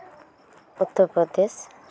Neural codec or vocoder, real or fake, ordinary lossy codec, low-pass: none; real; none; none